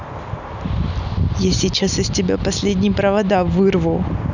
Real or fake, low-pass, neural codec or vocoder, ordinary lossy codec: real; 7.2 kHz; none; none